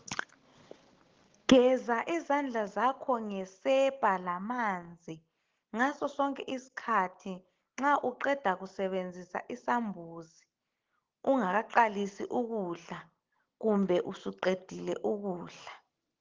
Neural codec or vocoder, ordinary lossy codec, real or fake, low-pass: none; Opus, 16 kbps; real; 7.2 kHz